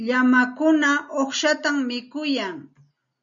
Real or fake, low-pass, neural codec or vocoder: real; 7.2 kHz; none